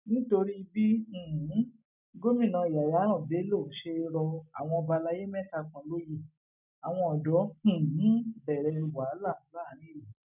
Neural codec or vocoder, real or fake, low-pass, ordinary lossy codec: none; real; 3.6 kHz; none